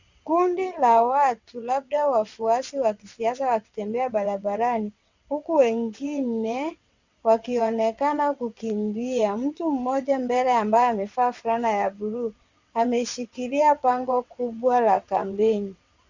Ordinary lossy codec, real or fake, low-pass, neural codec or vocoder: Opus, 64 kbps; fake; 7.2 kHz; vocoder, 22.05 kHz, 80 mel bands, WaveNeXt